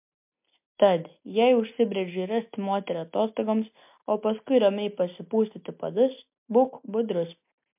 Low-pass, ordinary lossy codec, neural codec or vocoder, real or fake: 3.6 kHz; MP3, 32 kbps; none; real